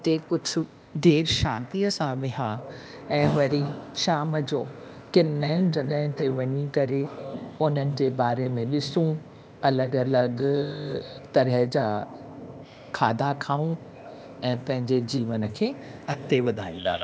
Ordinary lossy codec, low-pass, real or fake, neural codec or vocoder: none; none; fake; codec, 16 kHz, 0.8 kbps, ZipCodec